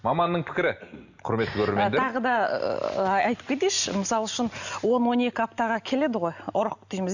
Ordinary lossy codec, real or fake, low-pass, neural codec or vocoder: none; real; 7.2 kHz; none